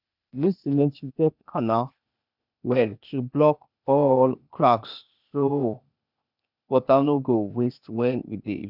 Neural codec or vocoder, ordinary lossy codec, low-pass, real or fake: codec, 16 kHz, 0.8 kbps, ZipCodec; none; 5.4 kHz; fake